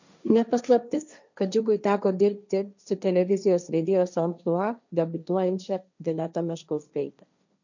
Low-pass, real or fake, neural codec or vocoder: 7.2 kHz; fake; codec, 16 kHz, 1.1 kbps, Voila-Tokenizer